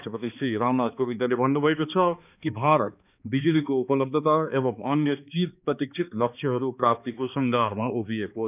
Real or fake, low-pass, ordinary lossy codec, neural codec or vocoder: fake; 3.6 kHz; none; codec, 16 kHz, 2 kbps, X-Codec, HuBERT features, trained on balanced general audio